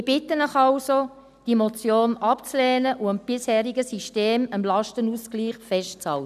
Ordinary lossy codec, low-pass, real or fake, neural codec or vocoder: none; 14.4 kHz; real; none